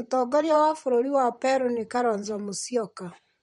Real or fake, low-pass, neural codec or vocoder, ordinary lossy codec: fake; 19.8 kHz; vocoder, 44.1 kHz, 128 mel bands, Pupu-Vocoder; MP3, 48 kbps